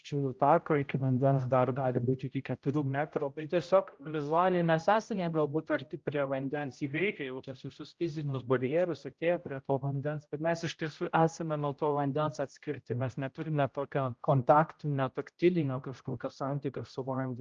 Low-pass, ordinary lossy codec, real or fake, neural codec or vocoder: 7.2 kHz; Opus, 24 kbps; fake; codec, 16 kHz, 0.5 kbps, X-Codec, HuBERT features, trained on general audio